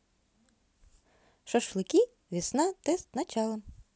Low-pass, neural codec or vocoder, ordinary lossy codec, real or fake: none; none; none; real